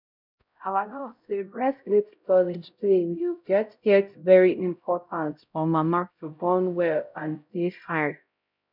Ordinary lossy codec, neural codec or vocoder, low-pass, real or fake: none; codec, 16 kHz, 0.5 kbps, X-Codec, HuBERT features, trained on LibriSpeech; 5.4 kHz; fake